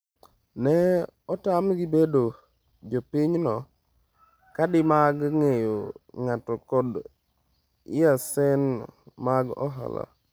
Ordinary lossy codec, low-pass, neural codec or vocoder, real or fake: none; none; none; real